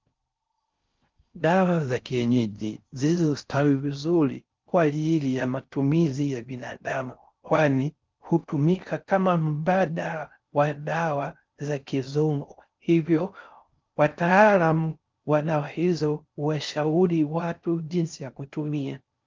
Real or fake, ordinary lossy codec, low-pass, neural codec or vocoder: fake; Opus, 24 kbps; 7.2 kHz; codec, 16 kHz in and 24 kHz out, 0.6 kbps, FocalCodec, streaming, 4096 codes